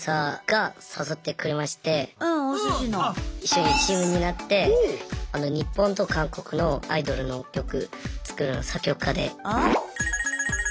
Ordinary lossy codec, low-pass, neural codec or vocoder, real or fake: none; none; none; real